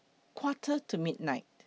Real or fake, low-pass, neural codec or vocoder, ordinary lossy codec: real; none; none; none